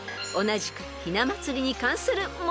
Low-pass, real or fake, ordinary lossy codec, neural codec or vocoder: none; real; none; none